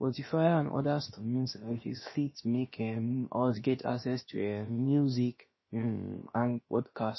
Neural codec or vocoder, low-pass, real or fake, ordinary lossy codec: codec, 16 kHz, about 1 kbps, DyCAST, with the encoder's durations; 7.2 kHz; fake; MP3, 24 kbps